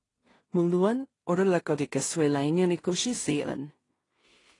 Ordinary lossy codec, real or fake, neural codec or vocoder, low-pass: AAC, 32 kbps; fake; codec, 16 kHz in and 24 kHz out, 0.4 kbps, LongCat-Audio-Codec, two codebook decoder; 10.8 kHz